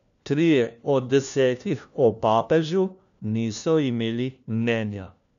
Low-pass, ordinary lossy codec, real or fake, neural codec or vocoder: 7.2 kHz; none; fake; codec, 16 kHz, 1 kbps, FunCodec, trained on LibriTTS, 50 frames a second